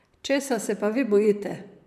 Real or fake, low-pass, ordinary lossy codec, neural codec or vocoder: fake; 14.4 kHz; none; vocoder, 44.1 kHz, 128 mel bands, Pupu-Vocoder